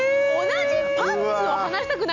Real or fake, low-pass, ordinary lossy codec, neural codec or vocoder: real; 7.2 kHz; none; none